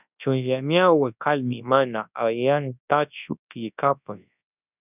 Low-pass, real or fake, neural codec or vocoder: 3.6 kHz; fake; codec, 24 kHz, 0.9 kbps, WavTokenizer, large speech release